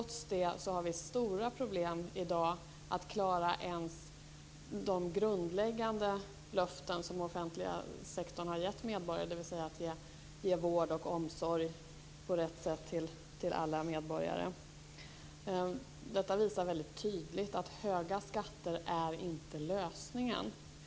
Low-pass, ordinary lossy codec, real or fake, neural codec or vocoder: none; none; real; none